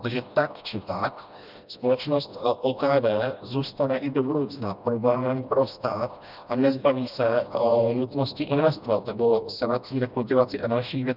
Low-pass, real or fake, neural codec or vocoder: 5.4 kHz; fake; codec, 16 kHz, 1 kbps, FreqCodec, smaller model